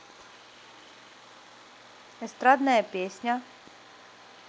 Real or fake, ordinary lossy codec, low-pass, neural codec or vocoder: real; none; none; none